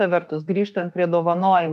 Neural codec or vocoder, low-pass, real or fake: autoencoder, 48 kHz, 32 numbers a frame, DAC-VAE, trained on Japanese speech; 14.4 kHz; fake